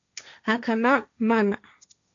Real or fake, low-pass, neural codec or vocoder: fake; 7.2 kHz; codec, 16 kHz, 1.1 kbps, Voila-Tokenizer